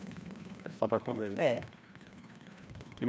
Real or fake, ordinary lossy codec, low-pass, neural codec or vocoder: fake; none; none; codec, 16 kHz, 1 kbps, FreqCodec, larger model